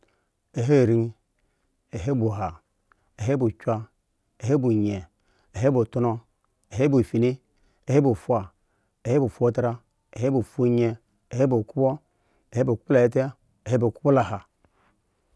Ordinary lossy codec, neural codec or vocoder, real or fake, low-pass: none; none; real; none